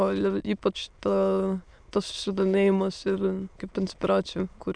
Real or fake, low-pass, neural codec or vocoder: fake; 9.9 kHz; autoencoder, 22.05 kHz, a latent of 192 numbers a frame, VITS, trained on many speakers